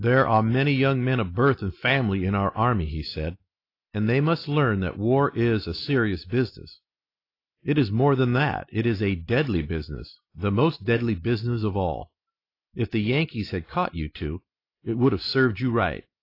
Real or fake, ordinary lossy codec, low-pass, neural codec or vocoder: real; AAC, 32 kbps; 5.4 kHz; none